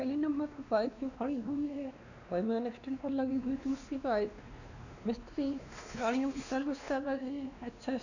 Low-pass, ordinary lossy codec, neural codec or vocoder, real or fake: 7.2 kHz; none; codec, 16 kHz, 2 kbps, X-Codec, WavLM features, trained on Multilingual LibriSpeech; fake